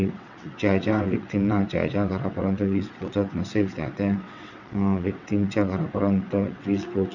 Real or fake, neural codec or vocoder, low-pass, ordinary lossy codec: fake; vocoder, 22.05 kHz, 80 mel bands, WaveNeXt; 7.2 kHz; none